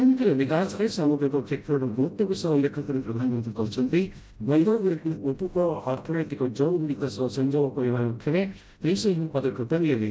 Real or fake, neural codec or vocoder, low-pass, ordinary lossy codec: fake; codec, 16 kHz, 0.5 kbps, FreqCodec, smaller model; none; none